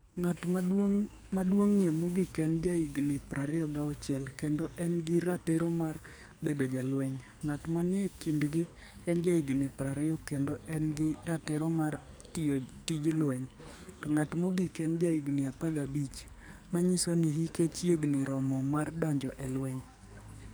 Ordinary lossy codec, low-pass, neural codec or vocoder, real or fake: none; none; codec, 44.1 kHz, 2.6 kbps, SNAC; fake